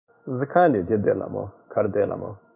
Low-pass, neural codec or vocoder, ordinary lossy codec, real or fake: 3.6 kHz; codec, 16 kHz in and 24 kHz out, 1 kbps, XY-Tokenizer; none; fake